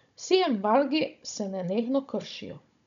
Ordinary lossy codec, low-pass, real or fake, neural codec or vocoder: none; 7.2 kHz; fake; codec, 16 kHz, 16 kbps, FunCodec, trained on Chinese and English, 50 frames a second